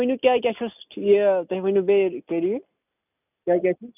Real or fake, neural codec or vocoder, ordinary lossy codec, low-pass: real; none; none; 3.6 kHz